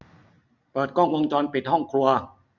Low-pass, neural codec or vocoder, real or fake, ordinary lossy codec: 7.2 kHz; none; real; none